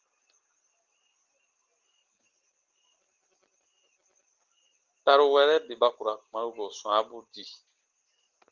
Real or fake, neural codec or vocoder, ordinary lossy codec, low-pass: real; none; Opus, 16 kbps; 7.2 kHz